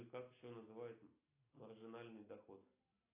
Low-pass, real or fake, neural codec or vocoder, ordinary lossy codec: 3.6 kHz; real; none; AAC, 16 kbps